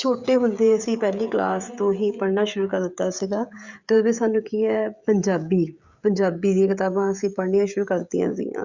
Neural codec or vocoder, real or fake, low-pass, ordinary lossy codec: codec, 16 kHz, 16 kbps, FreqCodec, smaller model; fake; 7.2 kHz; Opus, 64 kbps